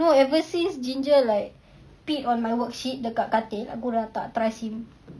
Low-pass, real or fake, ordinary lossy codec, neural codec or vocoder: none; real; none; none